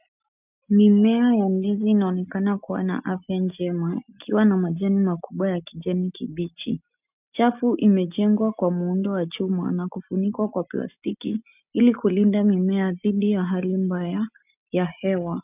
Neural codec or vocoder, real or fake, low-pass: none; real; 3.6 kHz